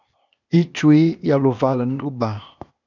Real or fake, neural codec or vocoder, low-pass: fake; codec, 16 kHz, 0.8 kbps, ZipCodec; 7.2 kHz